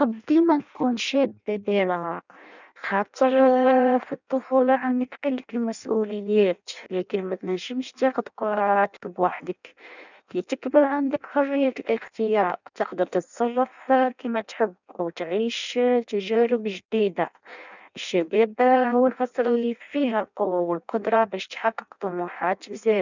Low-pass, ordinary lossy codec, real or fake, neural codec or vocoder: 7.2 kHz; none; fake; codec, 16 kHz in and 24 kHz out, 0.6 kbps, FireRedTTS-2 codec